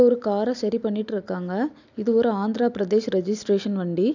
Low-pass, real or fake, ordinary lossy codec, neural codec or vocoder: 7.2 kHz; real; none; none